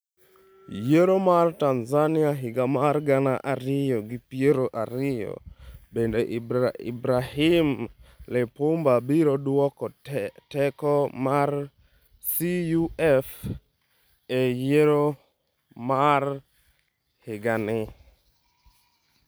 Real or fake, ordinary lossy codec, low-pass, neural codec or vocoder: real; none; none; none